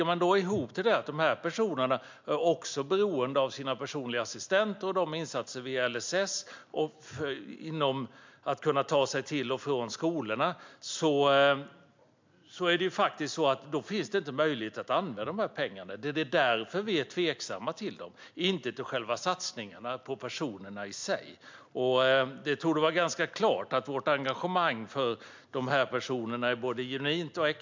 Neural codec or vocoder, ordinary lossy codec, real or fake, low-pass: none; MP3, 64 kbps; real; 7.2 kHz